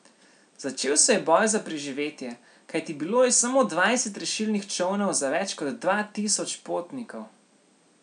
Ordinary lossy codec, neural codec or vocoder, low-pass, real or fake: none; none; 9.9 kHz; real